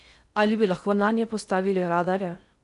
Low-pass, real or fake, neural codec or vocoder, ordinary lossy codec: 10.8 kHz; fake; codec, 16 kHz in and 24 kHz out, 0.6 kbps, FocalCodec, streaming, 4096 codes; none